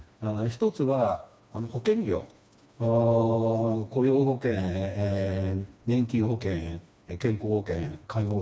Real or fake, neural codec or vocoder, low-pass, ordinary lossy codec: fake; codec, 16 kHz, 2 kbps, FreqCodec, smaller model; none; none